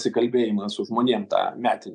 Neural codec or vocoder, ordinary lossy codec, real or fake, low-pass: none; MP3, 96 kbps; real; 9.9 kHz